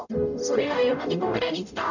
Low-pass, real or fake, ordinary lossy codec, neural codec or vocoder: 7.2 kHz; fake; none; codec, 44.1 kHz, 0.9 kbps, DAC